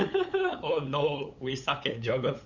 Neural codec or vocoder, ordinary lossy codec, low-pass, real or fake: codec, 16 kHz, 8 kbps, FunCodec, trained on LibriTTS, 25 frames a second; Opus, 64 kbps; 7.2 kHz; fake